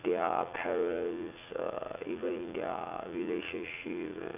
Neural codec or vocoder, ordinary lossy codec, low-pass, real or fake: vocoder, 44.1 kHz, 80 mel bands, Vocos; none; 3.6 kHz; fake